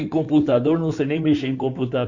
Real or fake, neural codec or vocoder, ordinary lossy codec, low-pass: fake; codec, 16 kHz in and 24 kHz out, 2.2 kbps, FireRedTTS-2 codec; Opus, 64 kbps; 7.2 kHz